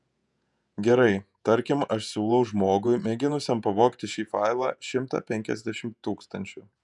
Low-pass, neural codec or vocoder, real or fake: 10.8 kHz; vocoder, 48 kHz, 128 mel bands, Vocos; fake